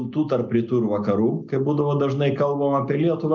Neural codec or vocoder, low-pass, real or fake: none; 7.2 kHz; real